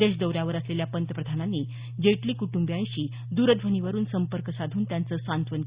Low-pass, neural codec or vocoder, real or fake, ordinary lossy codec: 3.6 kHz; none; real; Opus, 64 kbps